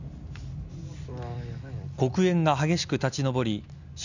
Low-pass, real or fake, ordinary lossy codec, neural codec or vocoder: 7.2 kHz; real; none; none